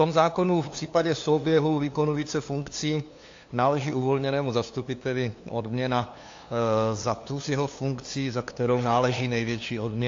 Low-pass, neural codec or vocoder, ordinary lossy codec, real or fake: 7.2 kHz; codec, 16 kHz, 2 kbps, FunCodec, trained on LibriTTS, 25 frames a second; AAC, 48 kbps; fake